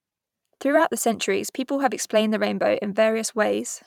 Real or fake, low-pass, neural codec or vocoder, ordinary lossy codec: fake; 19.8 kHz; vocoder, 44.1 kHz, 128 mel bands every 512 samples, BigVGAN v2; none